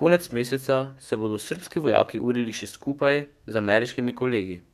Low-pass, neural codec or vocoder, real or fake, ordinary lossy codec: 14.4 kHz; codec, 32 kHz, 1.9 kbps, SNAC; fake; none